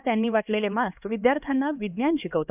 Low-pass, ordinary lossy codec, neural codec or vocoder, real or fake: 3.6 kHz; none; codec, 16 kHz, 2 kbps, X-Codec, HuBERT features, trained on LibriSpeech; fake